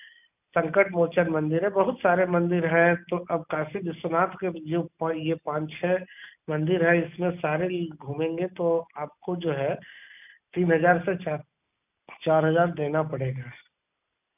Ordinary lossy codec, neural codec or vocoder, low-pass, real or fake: none; none; 3.6 kHz; real